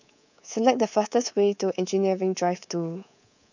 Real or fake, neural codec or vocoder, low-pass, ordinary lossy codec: fake; codec, 24 kHz, 3.1 kbps, DualCodec; 7.2 kHz; none